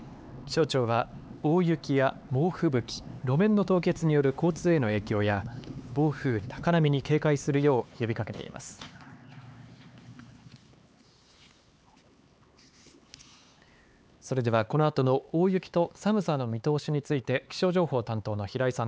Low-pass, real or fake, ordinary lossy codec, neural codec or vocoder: none; fake; none; codec, 16 kHz, 4 kbps, X-Codec, HuBERT features, trained on LibriSpeech